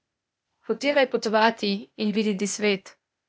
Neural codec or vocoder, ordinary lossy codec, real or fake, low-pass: codec, 16 kHz, 0.8 kbps, ZipCodec; none; fake; none